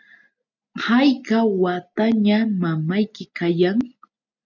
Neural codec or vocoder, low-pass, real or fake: none; 7.2 kHz; real